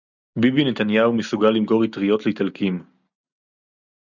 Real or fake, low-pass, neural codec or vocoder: real; 7.2 kHz; none